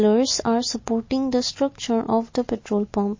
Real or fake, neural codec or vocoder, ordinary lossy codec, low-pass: real; none; MP3, 32 kbps; 7.2 kHz